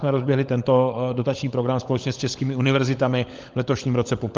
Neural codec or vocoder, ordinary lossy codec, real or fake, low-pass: codec, 16 kHz, 16 kbps, FunCodec, trained on LibriTTS, 50 frames a second; Opus, 32 kbps; fake; 7.2 kHz